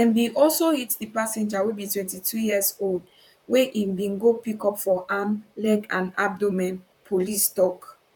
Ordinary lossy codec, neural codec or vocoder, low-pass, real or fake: none; vocoder, 44.1 kHz, 128 mel bands, Pupu-Vocoder; 19.8 kHz; fake